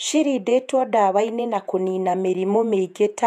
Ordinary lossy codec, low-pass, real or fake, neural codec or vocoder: none; 14.4 kHz; fake; vocoder, 48 kHz, 128 mel bands, Vocos